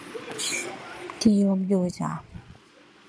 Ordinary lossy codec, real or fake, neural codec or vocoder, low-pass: none; fake; vocoder, 22.05 kHz, 80 mel bands, Vocos; none